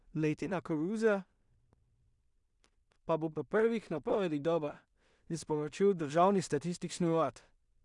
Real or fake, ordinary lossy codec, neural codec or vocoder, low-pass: fake; none; codec, 16 kHz in and 24 kHz out, 0.4 kbps, LongCat-Audio-Codec, two codebook decoder; 10.8 kHz